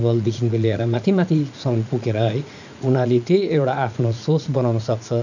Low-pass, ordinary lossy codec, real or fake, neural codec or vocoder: 7.2 kHz; none; fake; vocoder, 44.1 kHz, 80 mel bands, Vocos